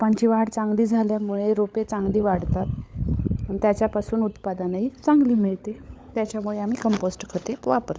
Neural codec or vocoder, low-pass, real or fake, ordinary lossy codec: codec, 16 kHz, 16 kbps, FunCodec, trained on LibriTTS, 50 frames a second; none; fake; none